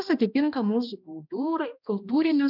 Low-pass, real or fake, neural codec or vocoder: 5.4 kHz; fake; codec, 16 kHz, 1 kbps, X-Codec, HuBERT features, trained on balanced general audio